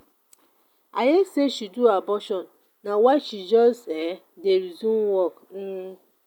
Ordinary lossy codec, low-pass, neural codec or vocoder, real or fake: none; 19.8 kHz; none; real